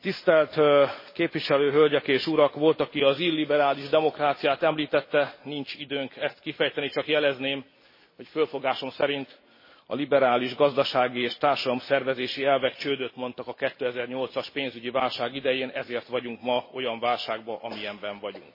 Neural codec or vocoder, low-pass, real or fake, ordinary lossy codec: none; 5.4 kHz; real; MP3, 24 kbps